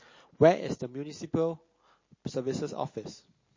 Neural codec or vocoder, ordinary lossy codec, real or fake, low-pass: none; MP3, 32 kbps; real; 7.2 kHz